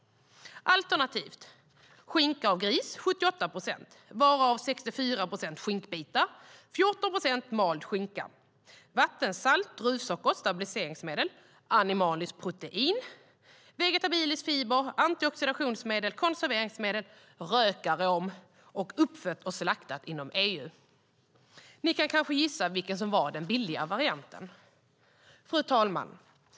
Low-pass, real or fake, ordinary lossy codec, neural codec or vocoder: none; real; none; none